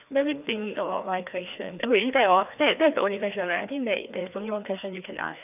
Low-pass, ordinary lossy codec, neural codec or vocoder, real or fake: 3.6 kHz; none; codec, 16 kHz, 2 kbps, FreqCodec, larger model; fake